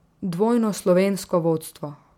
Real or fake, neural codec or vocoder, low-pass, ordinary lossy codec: real; none; 19.8 kHz; MP3, 96 kbps